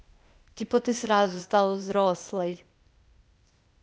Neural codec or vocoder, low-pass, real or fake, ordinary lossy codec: codec, 16 kHz, 0.8 kbps, ZipCodec; none; fake; none